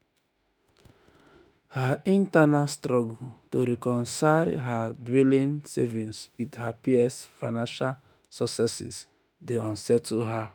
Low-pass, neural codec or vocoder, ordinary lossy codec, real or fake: none; autoencoder, 48 kHz, 32 numbers a frame, DAC-VAE, trained on Japanese speech; none; fake